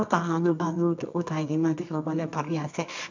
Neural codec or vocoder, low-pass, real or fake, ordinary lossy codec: codec, 24 kHz, 0.9 kbps, WavTokenizer, medium music audio release; 7.2 kHz; fake; MP3, 64 kbps